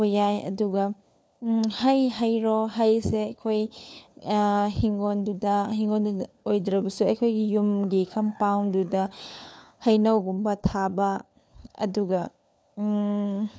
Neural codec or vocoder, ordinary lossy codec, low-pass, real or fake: codec, 16 kHz, 4 kbps, FunCodec, trained on LibriTTS, 50 frames a second; none; none; fake